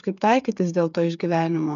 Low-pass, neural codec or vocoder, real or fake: 7.2 kHz; codec, 16 kHz, 8 kbps, FreqCodec, smaller model; fake